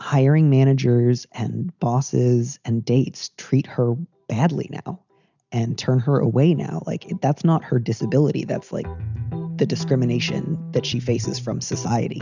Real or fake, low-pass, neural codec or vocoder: real; 7.2 kHz; none